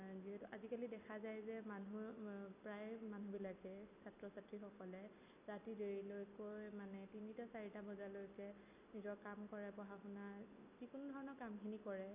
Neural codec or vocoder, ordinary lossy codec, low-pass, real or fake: none; none; 3.6 kHz; real